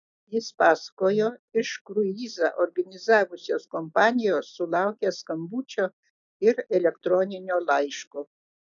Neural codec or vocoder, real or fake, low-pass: none; real; 7.2 kHz